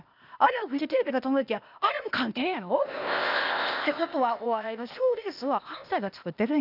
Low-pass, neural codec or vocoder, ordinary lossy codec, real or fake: 5.4 kHz; codec, 16 kHz, 0.8 kbps, ZipCodec; none; fake